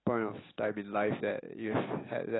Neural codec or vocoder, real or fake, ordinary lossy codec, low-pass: none; real; AAC, 16 kbps; 7.2 kHz